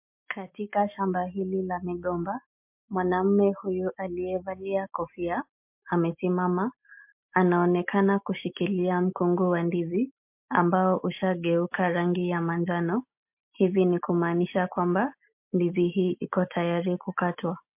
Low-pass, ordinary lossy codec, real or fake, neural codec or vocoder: 3.6 kHz; MP3, 32 kbps; real; none